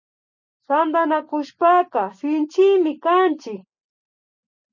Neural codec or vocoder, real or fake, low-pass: none; real; 7.2 kHz